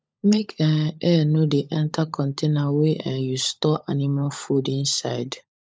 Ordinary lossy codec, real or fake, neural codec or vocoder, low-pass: none; fake; codec, 16 kHz, 16 kbps, FunCodec, trained on LibriTTS, 50 frames a second; none